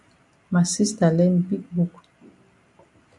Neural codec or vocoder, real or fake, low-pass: none; real; 10.8 kHz